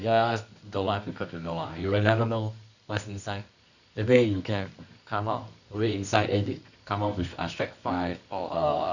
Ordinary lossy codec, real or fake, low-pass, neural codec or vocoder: none; fake; 7.2 kHz; codec, 24 kHz, 0.9 kbps, WavTokenizer, medium music audio release